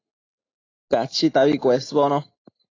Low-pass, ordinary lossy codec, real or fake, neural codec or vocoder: 7.2 kHz; AAC, 32 kbps; real; none